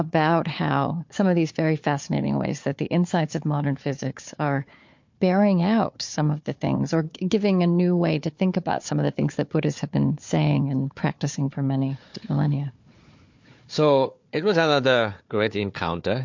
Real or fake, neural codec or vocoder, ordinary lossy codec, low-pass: fake; codec, 16 kHz, 4 kbps, FunCodec, trained on Chinese and English, 50 frames a second; MP3, 48 kbps; 7.2 kHz